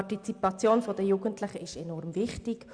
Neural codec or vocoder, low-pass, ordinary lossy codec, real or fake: none; 9.9 kHz; Opus, 64 kbps; real